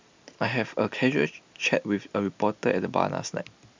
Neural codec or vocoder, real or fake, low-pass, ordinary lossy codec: none; real; 7.2 kHz; MP3, 64 kbps